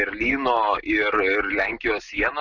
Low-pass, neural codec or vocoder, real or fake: 7.2 kHz; none; real